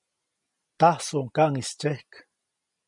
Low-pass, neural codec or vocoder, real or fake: 10.8 kHz; none; real